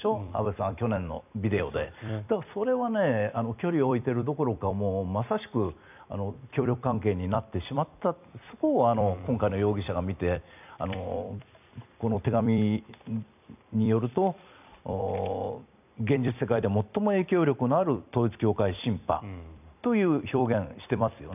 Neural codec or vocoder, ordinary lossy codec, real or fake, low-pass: vocoder, 44.1 kHz, 128 mel bands every 256 samples, BigVGAN v2; none; fake; 3.6 kHz